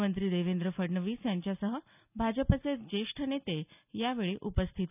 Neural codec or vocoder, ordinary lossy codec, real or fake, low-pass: none; none; real; 3.6 kHz